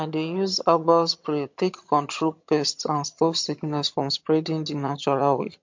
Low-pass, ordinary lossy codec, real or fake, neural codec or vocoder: 7.2 kHz; MP3, 48 kbps; fake; vocoder, 22.05 kHz, 80 mel bands, HiFi-GAN